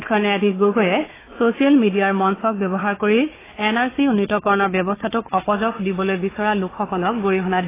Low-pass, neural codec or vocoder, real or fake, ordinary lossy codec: 3.6 kHz; codec, 16 kHz, 4 kbps, FunCodec, trained on Chinese and English, 50 frames a second; fake; AAC, 16 kbps